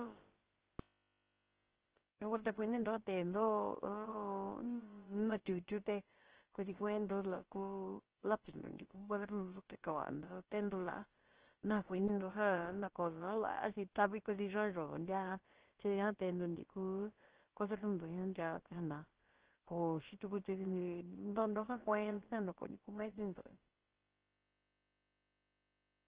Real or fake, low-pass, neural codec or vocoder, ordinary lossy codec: fake; 3.6 kHz; codec, 16 kHz, about 1 kbps, DyCAST, with the encoder's durations; Opus, 16 kbps